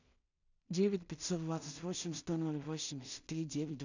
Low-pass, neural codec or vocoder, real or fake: 7.2 kHz; codec, 16 kHz in and 24 kHz out, 0.4 kbps, LongCat-Audio-Codec, two codebook decoder; fake